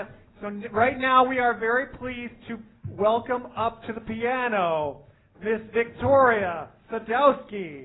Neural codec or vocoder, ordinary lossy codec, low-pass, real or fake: none; AAC, 16 kbps; 7.2 kHz; real